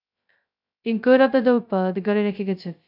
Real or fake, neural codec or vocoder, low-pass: fake; codec, 16 kHz, 0.2 kbps, FocalCodec; 5.4 kHz